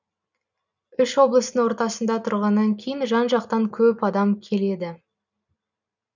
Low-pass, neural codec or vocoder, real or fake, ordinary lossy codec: 7.2 kHz; none; real; none